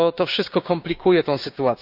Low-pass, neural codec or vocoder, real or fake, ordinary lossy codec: 5.4 kHz; codec, 16 kHz, 6 kbps, DAC; fake; none